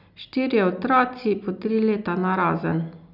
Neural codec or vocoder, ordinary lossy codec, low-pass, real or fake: none; none; 5.4 kHz; real